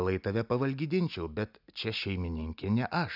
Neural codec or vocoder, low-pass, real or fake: none; 5.4 kHz; real